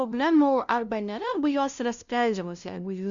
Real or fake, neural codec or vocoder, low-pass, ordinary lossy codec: fake; codec, 16 kHz, 0.5 kbps, FunCodec, trained on LibriTTS, 25 frames a second; 7.2 kHz; Opus, 64 kbps